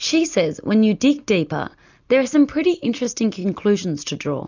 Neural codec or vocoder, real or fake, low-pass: none; real; 7.2 kHz